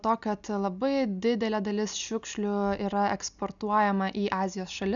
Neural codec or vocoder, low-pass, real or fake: none; 7.2 kHz; real